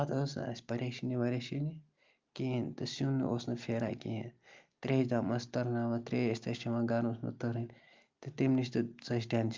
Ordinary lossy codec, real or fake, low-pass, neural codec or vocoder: Opus, 32 kbps; real; 7.2 kHz; none